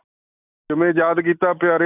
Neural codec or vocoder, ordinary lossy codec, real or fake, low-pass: none; none; real; 3.6 kHz